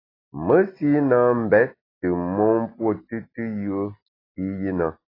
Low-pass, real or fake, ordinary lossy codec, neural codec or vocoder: 5.4 kHz; real; AAC, 24 kbps; none